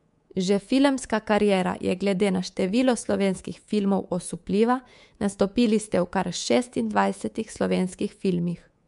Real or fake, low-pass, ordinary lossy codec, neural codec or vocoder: fake; 10.8 kHz; MP3, 64 kbps; codec, 24 kHz, 3.1 kbps, DualCodec